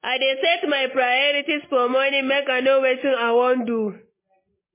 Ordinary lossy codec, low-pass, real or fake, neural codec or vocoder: MP3, 16 kbps; 3.6 kHz; real; none